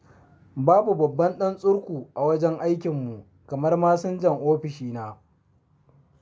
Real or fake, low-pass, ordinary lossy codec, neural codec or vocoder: real; none; none; none